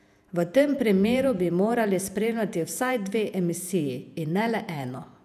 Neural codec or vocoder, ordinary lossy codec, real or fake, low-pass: vocoder, 48 kHz, 128 mel bands, Vocos; none; fake; 14.4 kHz